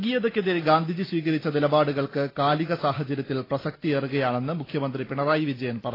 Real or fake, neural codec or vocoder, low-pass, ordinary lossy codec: real; none; 5.4 kHz; AAC, 24 kbps